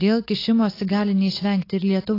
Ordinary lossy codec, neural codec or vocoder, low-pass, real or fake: AAC, 24 kbps; none; 5.4 kHz; real